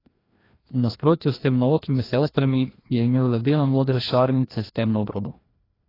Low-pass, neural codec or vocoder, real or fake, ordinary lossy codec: 5.4 kHz; codec, 16 kHz, 1 kbps, FreqCodec, larger model; fake; AAC, 24 kbps